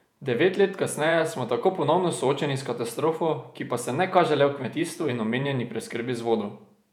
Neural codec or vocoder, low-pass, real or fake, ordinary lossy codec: vocoder, 48 kHz, 128 mel bands, Vocos; 19.8 kHz; fake; none